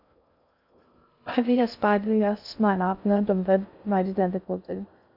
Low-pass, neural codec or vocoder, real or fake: 5.4 kHz; codec, 16 kHz in and 24 kHz out, 0.6 kbps, FocalCodec, streaming, 2048 codes; fake